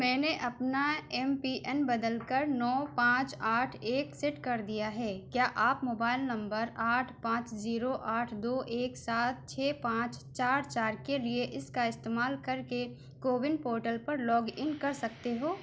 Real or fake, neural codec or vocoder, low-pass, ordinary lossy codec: real; none; none; none